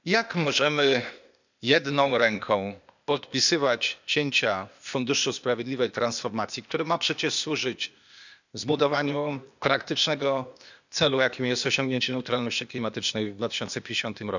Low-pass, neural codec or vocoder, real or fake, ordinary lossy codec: 7.2 kHz; codec, 16 kHz, 0.8 kbps, ZipCodec; fake; none